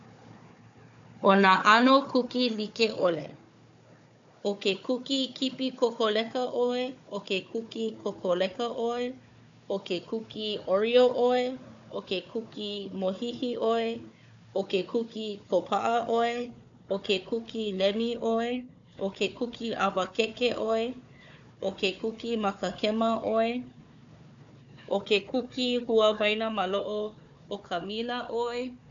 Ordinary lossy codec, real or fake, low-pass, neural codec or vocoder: AAC, 64 kbps; fake; 7.2 kHz; codec, 16 kHz, 4 kbps, FunCodec, trained on Chinese and English, 50 frames a second